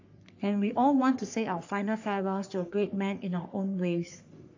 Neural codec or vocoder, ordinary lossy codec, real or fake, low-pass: codec, 44.1 kHz, 3.4 kbps, Pupu-Codec; AAC, 48 kbps; fake; 7.2 kHz